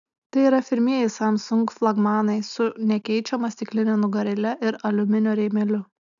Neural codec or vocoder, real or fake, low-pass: none; real; 7.2 kHz